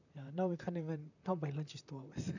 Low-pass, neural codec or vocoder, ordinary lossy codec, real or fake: 7.2 kHz; vocoder, 44.1 kHz, 128 mel bands, Pupu-Vocoder; none; fake